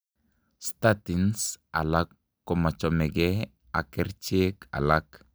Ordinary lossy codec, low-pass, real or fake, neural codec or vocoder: none; none; real; none